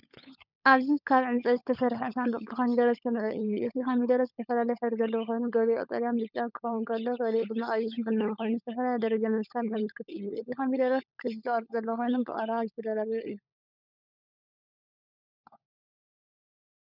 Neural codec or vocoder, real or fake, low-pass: codec, 16 kHz, 16 kbps, FunCodec, trained on LibriTTS, 50 frames a second; fake; 5.4 kHz